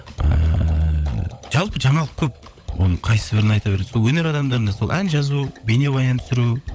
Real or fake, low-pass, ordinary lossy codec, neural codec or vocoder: fake; none; none; codec, 16 kHz, 16 kbps, FunCodec, trained on LibriTTS, 50 frames a second